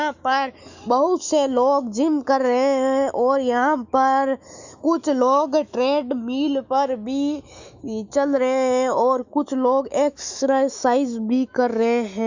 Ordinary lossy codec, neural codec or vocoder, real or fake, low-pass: Opus, 64 kbps; autoencoder, 48 kHz, 128 numbers a frame, DAC-VAE, trained on Japanese speech; fake; 7.2 kHz